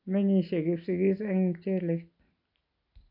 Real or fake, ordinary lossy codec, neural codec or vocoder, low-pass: real; AAC, 32 kbps; none; 5.4 kHz